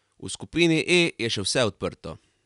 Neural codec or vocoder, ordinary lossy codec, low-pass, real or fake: none; none; 10.8 kHz; real